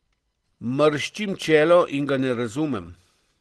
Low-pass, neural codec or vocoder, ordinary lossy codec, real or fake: 10.8 kHz; none; Opus, 16 kbps; real